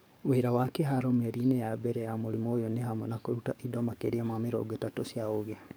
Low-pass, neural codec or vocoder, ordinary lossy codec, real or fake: none; codec, 44.1 kHz, 7.8 kbps, DAC; none; fake